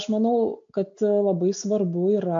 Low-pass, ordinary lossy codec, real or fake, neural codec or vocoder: 7.2 kHz; AAC, 64 kbps; real; none